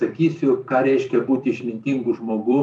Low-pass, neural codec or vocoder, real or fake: 10.8 kHz; vocoder, 44.1 kHz, 128 mel bands every 256 samples, BigVGAN v2; fake